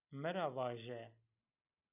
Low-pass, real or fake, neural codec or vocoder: 3.6 kHz; real; none